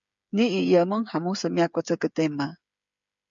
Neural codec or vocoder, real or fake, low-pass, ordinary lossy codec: codec, 16 kHz, 8 kbps, FreqCodec, smaller model; fake; 7.2 kHz; MP3, 64 kbps